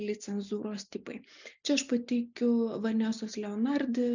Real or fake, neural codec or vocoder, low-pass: real; none; 7.2 kHz